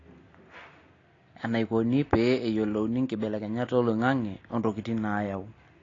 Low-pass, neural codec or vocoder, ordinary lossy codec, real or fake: 7.2 kHz; none; AAC, 32 kbps; real